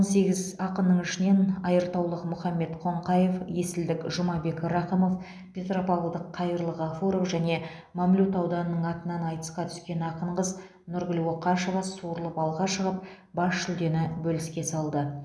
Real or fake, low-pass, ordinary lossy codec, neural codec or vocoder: real; none; none; none